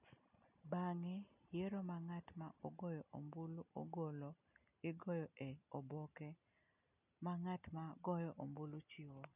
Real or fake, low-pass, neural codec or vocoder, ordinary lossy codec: real; 3.6 kHz; none; none